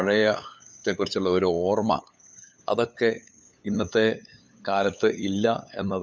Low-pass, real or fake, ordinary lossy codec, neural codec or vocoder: none; fake; none; codec, 16 kHz, 8 kbps, FunCodec, trained on LibriTTS, 25 frames a second